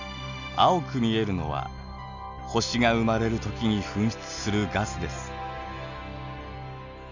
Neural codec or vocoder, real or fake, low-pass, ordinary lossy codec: none; real; 7.2 kHz; none